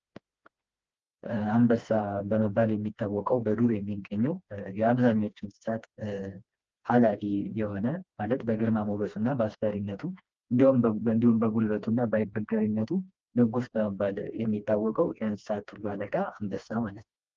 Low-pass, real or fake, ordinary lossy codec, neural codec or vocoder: 7.2 kHz; fake; Opus, 24 kbps; codec, 16 kHz, 2 kbps, FreqCodec, smaller model